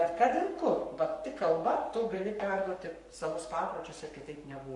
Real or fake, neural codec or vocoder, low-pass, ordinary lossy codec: fake; codec, 44.1 kHz, 7.8 kbps, Pupu-Codec; 10.8 kHz; MP3, 48 kbps